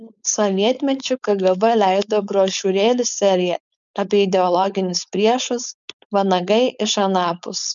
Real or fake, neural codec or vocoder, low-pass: fake; codec, 16 kHz, 4.8 kbps, FACodec; 7.2 kHz